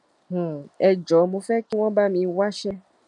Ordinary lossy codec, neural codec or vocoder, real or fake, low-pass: none; none; real; 10.8 kHz